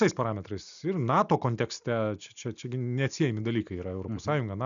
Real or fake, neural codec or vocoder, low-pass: real; none; 7.2 kHz